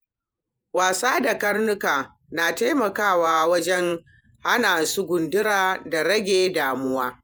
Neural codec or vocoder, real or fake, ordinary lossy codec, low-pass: vocoder, 48 kHz, 128 mel bands, Vocos; fake; none; none